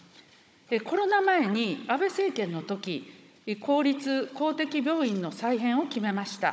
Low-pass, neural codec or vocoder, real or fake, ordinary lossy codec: none; codec, 16 kHz, 16 kbps, FunCodec, trained on Chinese and English, 50 frames a second; fake; none